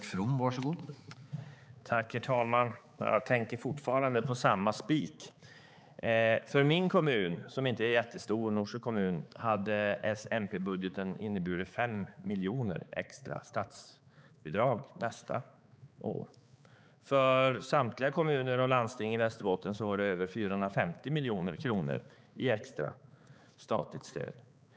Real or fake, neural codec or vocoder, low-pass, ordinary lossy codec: fake; codec, 16 kHz, 4 kbps, X-Codec, HuBERT features, trained on balanced general audio; none; none